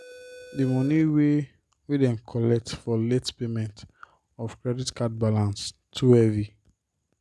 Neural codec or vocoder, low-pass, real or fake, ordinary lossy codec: none; none; real; none